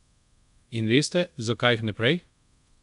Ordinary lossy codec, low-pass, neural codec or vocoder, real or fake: none; 10.8 kHz; codec, 24 kHz, 0.5 kbps, DualCodec; fake